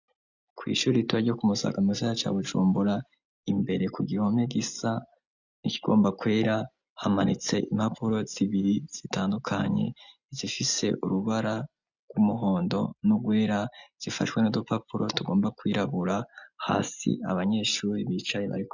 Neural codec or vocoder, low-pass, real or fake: none; 7.2 kHz; real